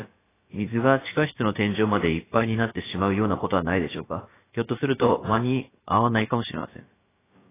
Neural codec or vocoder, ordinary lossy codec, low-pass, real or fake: codec, 16 kHz, about 1 kbps, DyCAST, with the encoder's durations; AAC, 16 kbps; 3.6 kHz; fake